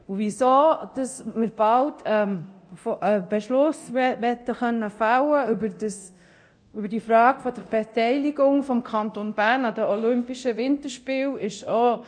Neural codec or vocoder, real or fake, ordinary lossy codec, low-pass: codec, 24 kHz, 0.9 kbps, DualCodec; fake; none; 9.9 kHz